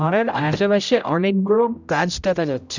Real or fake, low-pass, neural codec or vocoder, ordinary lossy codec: fake; 7.2 kHz; codec, 16 kHz, 0.5 kbps, X-Codec, HuBERT features, trained on general audio; none